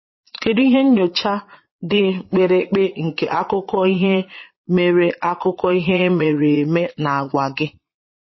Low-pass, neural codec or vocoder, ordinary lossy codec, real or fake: 7.2 kHz; vocoder, 22.05 kHz, 80 mel bands, Vocos; MP3, 24 kbps; fake